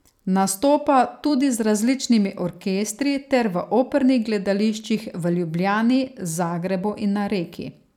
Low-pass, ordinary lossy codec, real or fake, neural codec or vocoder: 19.8 kHz; none; fake; vocoder, 44.1 kHz, 128 mel bands every 256 samples, BigVGAN v2